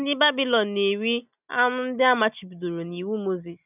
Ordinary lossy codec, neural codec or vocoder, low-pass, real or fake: none; none; 3.6 kHz; real